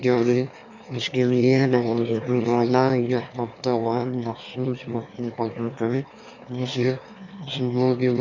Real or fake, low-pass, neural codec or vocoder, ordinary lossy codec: fake; 7.2 kHz; autoencoder, 22.05 kHz, a latent of 192 numbers a frame, VITS, trained on one speaker; none